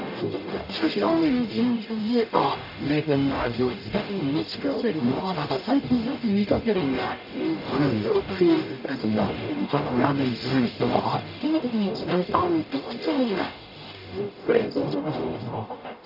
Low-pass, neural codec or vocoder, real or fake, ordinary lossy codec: 5.4 kHz; codec, 44.1 kHz, 0.9 kbps, DAC; fake; none